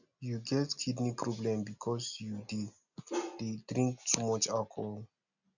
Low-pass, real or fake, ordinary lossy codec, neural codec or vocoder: 7.2 kHz; real; none; none